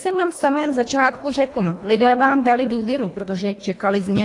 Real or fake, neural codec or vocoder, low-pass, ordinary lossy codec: fake; codec, 24 kHz, 1.5 kbps, HILCodec; 10.8 kHz; AAC, 48 kbps